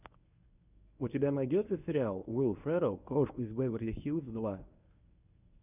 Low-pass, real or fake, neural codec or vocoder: 3.6 kHz; fake; codec, 24 kHz, 0.9 kbps, WavTokenizer, medium speech release version 1